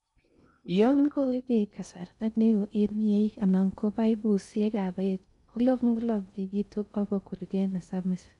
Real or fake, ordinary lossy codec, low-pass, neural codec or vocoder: fake; none; 10.8 kHz; codec, 16 kHz in and 24 kHz out, 0.6 kbps, FocalCodec, streaming, 2048 codes